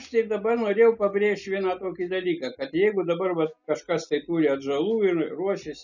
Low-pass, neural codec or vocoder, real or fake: 7.2 kHz; none; real